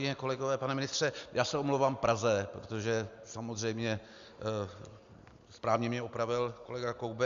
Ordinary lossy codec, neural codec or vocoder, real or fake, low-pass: Opus, 64 kbps; none; real; 7.2 kHz